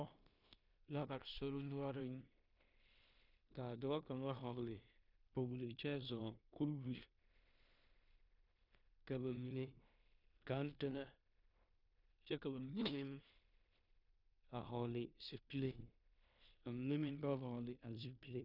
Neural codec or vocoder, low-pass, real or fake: codec, 16 kHz in and 24 kHz out, 0.9 kbps, LongCat-Audio-Codec, four codebook decoder; 5.4 kHz; fake